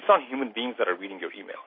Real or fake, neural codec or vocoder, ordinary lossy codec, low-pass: real; none; MP3, 24 kbps; 5.4 kHz